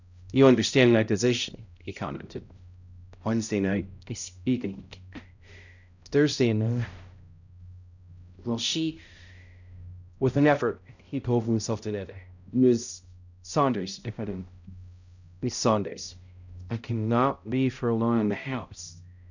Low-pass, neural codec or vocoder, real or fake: 7.2 kHz; codec, 16 kHz, 0.5 kbps, X-Codec, HuBERT features, trained on balanced general audio; fake